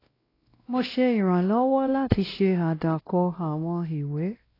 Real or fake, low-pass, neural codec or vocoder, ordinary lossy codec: fake; 5.4 kHz; codec, 16 kHz, 1 kbps, X-Codec, WavLM features, trained on Multilingual LibriSpeech; AAC, 24 kbps